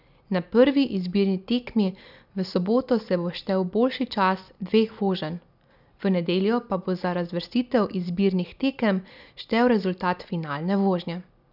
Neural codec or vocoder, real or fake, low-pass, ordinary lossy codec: none; real; 5.4 kHz; none